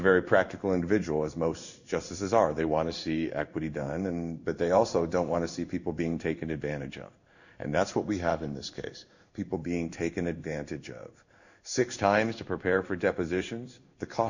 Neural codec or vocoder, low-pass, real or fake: codec, 16 kHz in and 24 kHz out, 1 kbps, XY-Tokenizer; 7.2 kHz; fake